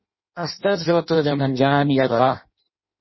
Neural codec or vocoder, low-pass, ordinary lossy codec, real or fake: codec, 16 kHz in and 24 kHz out, 0.6 kbps, FireRedTTS-2 codec; 7.2 kHz; MP3, 24 kbps; fake